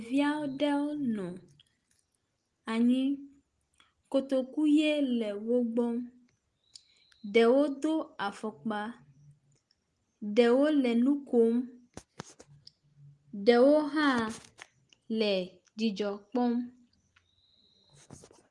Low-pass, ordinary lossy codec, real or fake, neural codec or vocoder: 10.8 kHz; Opus, 24 kbps; real; none